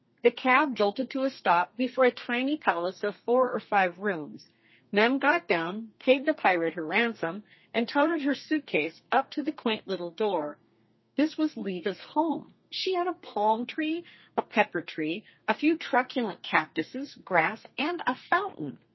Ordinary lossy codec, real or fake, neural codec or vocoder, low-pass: MP3, 24 kbps; fake; codec, 32 kHz, 1.9 kbps, SNAC; 7.2 kHz